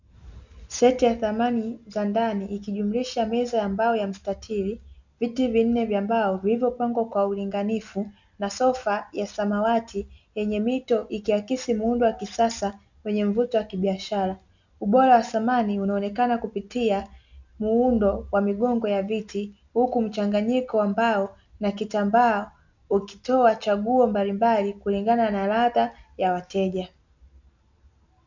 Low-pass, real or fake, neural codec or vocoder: 7.2 kHz; real; none